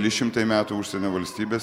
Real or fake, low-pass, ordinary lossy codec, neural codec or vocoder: real; 19.8 kHz; MP3, 96 kbps; none